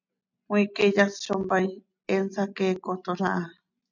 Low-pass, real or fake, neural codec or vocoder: 7.2 kHz; real; none